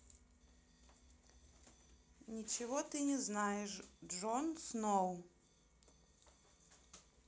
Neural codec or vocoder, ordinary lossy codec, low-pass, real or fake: none; none; none; real